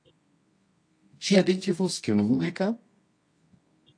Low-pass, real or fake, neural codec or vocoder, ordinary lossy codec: 9.9 kHz; fake; codec, 24 kHz, 0.9 kbps, WavTokenizer, medium music audio release; AAC, 48 kbps